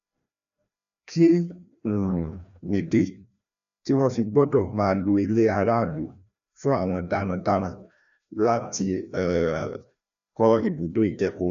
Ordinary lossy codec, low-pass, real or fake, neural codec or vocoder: none; 7.2 kHz; fake; codec, 16 kHz, 1 kbps, FreqCodec, larger model